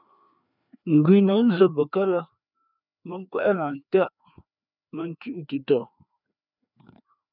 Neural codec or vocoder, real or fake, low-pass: codec, 16 kHz, 2 kbps, FreqCodec, larger model; fake; 5.4 kHz